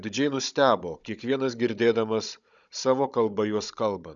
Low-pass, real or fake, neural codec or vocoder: 7.2 kHz; fake; codec, 16 kHz, 16 kbps, FunCodec, trained on Chinese and English, 50 frames a second